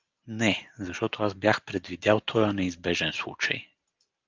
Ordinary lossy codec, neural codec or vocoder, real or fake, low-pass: Opus, 32 kbps; none; real; 7.2 kHz